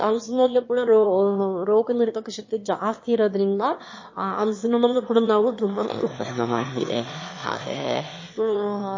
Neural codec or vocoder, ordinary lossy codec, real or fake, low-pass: autoencoder, 22.05 kHz, a latent of 192 numbers a frame, VITS, trained on one speaker; MP3, 32 kbps; fake; 7.2 kHz